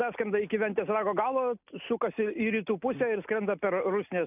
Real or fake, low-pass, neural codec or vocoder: real; 3.6 kHz; none